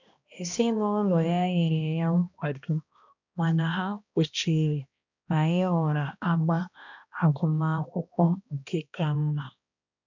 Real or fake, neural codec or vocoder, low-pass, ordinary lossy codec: fake; codec, 16 kHz, 1 kbps, X-Codec, HuBERT features, trained on balanced general audio; 7.2 kHz; none